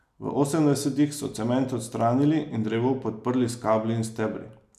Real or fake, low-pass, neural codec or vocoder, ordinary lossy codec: real; 14.4 kHz; none; none